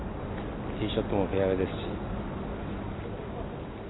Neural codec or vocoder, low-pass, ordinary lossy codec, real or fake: none; 7.2 kHz; AAC, 16 kbps; real